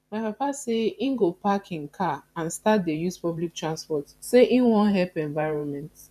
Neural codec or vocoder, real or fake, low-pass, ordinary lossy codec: vocoder, 44.1 kHz, 128 mel bands every 512 samples, BigVGAN v2; fake; 14.4 kHz; none